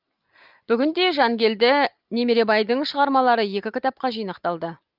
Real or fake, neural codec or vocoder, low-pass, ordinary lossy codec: real; none; 5.4 kHz; Opus, 32 kbps